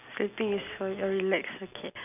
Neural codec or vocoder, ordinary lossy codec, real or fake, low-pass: none; none; real; 3.6 kHz